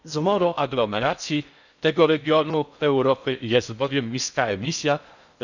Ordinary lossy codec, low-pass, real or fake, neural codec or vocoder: none; 7.2 kHz; fake; codec, 16 kHz in and 24 kHz out, 0.6 kbps, FocalCodec, streaming, 4096 codes